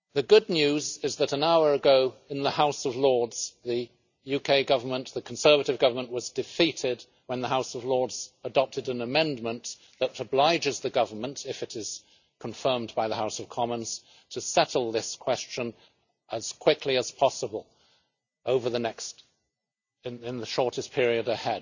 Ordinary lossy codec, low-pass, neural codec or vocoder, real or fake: none; 7.2 kHz; none; real